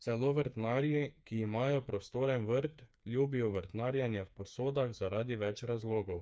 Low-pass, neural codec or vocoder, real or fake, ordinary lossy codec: none; codec, 16 kHz, 4 kbps, FreqCodec, smaller model; fake; none